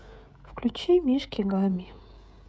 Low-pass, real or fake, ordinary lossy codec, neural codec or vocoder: none; fake; none; codec, 16 kHz, 16 kbps, FreqCodec, smaller model